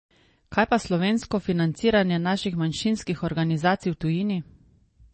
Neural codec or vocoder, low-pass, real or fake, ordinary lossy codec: none; 10.8 kHz; real; MP3, 32 kbps